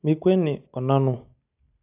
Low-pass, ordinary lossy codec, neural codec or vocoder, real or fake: 3.6 kHz; AAC, 32 kbps; none; real